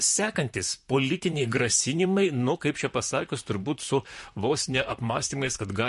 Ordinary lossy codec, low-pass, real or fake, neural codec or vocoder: MP3, 48 kbps; 14.4 kHz; fake; vocoder, 44.1 kHz, 128 mel bands, Pupu-Vocoder